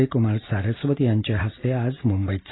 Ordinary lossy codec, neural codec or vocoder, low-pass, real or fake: AAC, 16 kbps; none; 7.2 kHz; real